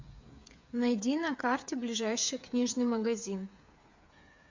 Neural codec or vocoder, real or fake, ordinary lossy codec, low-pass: codec, 16 kHz, 8 kbps, FreqCodec, smaller model; fake; MP3, 64 kbps; 7.2 kHz